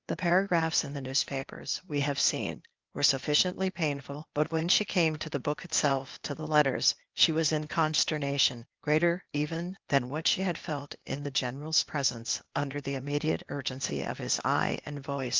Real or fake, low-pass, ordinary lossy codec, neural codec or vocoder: fake; 7.2 kHz; Opus, 24 kbps; codec, 16 kHz, 0.8 kbps, ZipCodec